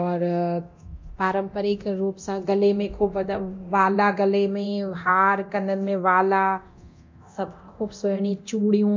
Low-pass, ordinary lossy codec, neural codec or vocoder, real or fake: 7.2 kHz; MP3, 48 kbps; codec, 24 kHz, 0.9 kbps, DualCodec; fake